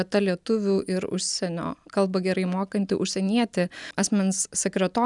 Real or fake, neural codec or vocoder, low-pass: real; none; 10.8 kHz